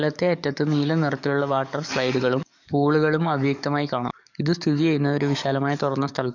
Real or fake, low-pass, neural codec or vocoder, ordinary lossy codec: fake; 7.2 kHz; codec, 44.1 kHz, 7.8 kbps, DAC; none